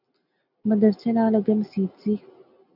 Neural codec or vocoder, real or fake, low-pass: none; real; 5.4 kHz